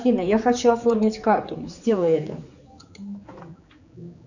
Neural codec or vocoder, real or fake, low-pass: codec, 16 kHz, 4 kbps, X-Codec, HuBERT features, trained on general audio; fake; 7.2 kHz